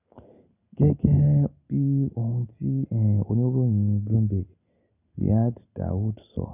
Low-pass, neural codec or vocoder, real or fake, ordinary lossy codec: 3.6 kHz; none; real; none